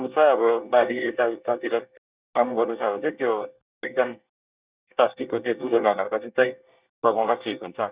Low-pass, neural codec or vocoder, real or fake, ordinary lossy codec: 3.6 kHz; codec, 24 kHz, 1 kbps, SNAC; fake; Opus, 64 kbps